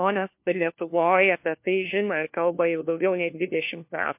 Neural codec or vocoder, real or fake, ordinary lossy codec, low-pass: codec, 16 kHz, 1 kbps, FunCodec, trained on LibriTTS, 50 frames a second; fake; MP3, 32 kbps; 3.6 kHz